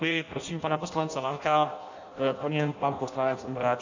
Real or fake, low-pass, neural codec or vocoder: fake; 7.2 kHz; codec, 16 kHz in and 24 kHz out, 0.6 kbps, FireRedTTS-2 codec